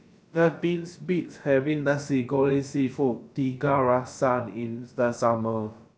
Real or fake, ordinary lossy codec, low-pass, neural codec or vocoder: fake; none; none; codec, 16 kHz, about 1 kbps, DyCAST, with the encoder's durations